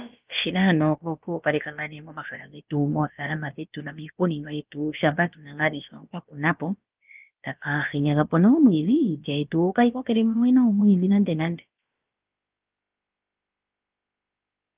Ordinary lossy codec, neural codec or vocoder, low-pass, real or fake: Opus, 32 kbps; codec, 16 kHz, about 1 kbps, DyCAST, with the encoder's durations; 3.6 kHz; fake